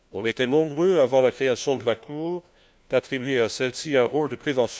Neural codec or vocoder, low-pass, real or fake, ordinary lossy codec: codec, 16 kHz, 1 kbps, FunCodec, trained on LibriTTS, 50 frames a second; none; fake; none